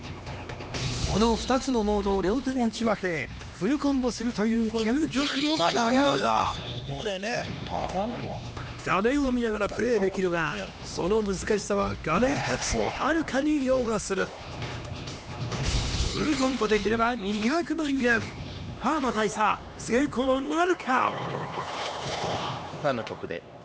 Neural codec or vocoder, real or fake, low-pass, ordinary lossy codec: codec, 16 kHz, 2 kbps, X-Codec, HuBERT features, trained on LibriSpeech; fake; none; none